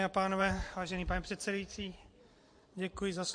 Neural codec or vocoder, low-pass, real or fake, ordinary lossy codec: vocoder, 44.1 kHz, 128 mel bands every 512 samples, BigVGAN v2; 9.9 kHz; fake; MP3, 48 kbps